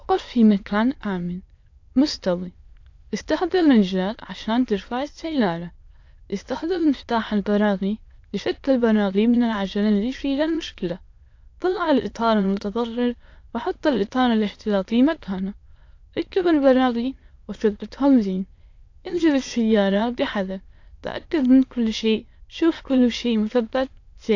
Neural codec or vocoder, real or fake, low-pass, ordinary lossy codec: autoencoder, 22.05 kHz, a latent of 192 numbers a frame, VITS, trained on many speakers; fake; 7.2 kHz; AAC, 48 kbps